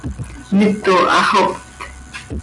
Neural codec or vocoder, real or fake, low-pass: none; real; 10.8 kHz